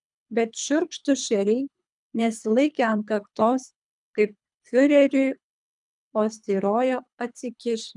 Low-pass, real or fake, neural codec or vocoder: 10.8 kHz; fake; codec, 24 kHz, 3 kbps, HILCodec